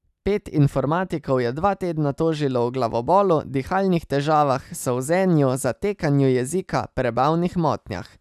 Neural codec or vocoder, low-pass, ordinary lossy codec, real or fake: none; 14.4 kHz; none; real